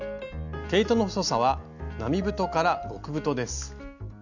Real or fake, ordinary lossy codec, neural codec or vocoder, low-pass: real; none; none; 7.2 kHz